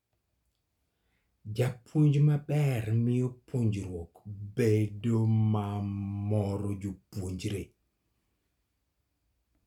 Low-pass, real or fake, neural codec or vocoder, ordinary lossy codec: 19.8 kHz; real; none; none